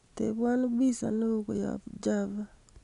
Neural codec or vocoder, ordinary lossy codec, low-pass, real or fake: none; none; 10.8 kHz; real